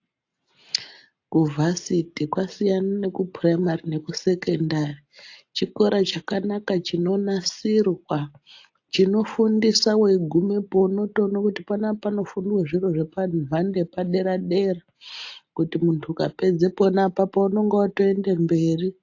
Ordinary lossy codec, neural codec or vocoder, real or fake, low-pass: AAC, 48 kbps; none; real; 7.2 kHz